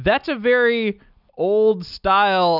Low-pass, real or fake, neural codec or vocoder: 5.4 kHz; real; none